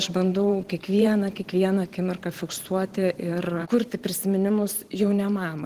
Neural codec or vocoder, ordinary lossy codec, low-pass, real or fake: vocoder, 44.1 kHz, 128 mel bands every 512 samples, BigVGAN v2; Opus, 16 kbps; 14.4 kHz; fake